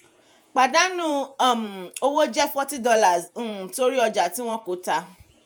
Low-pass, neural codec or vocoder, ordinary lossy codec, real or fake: none; vocoder, 48 kHz, 128 mel bands, Vocos; none; fake